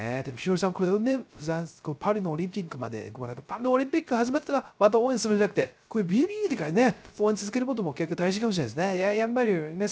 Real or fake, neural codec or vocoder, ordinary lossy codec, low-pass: fake; codec, 16 kHz, 0.3 kbps, FocalCodec; none; none